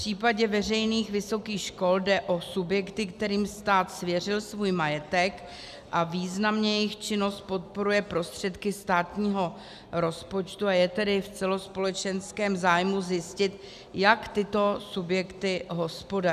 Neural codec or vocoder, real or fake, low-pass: none; real; 14.4 kHz